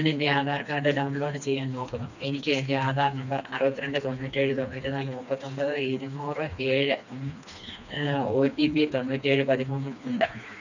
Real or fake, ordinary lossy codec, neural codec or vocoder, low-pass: fake; none; codec, 16 kHz, 2 kbps, FreqCodec, smaller model; 7.2 kHz